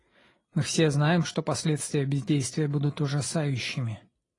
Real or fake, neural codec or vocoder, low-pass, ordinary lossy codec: real; none; 10.8 kHz; AAC, 32 kbps